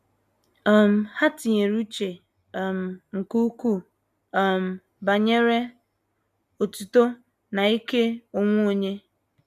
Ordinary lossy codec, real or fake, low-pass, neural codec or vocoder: none; real; 14.4 kHz; none